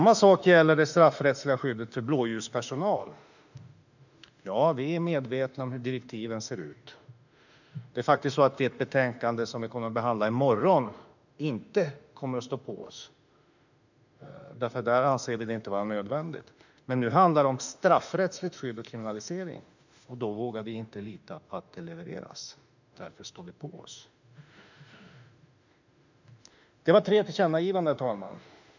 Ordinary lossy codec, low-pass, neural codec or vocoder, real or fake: none; 7.2 kHz; autoencoder, 48 kHz, 32 numbers a frame, DAC-VAE, trained on Japanese speech; fake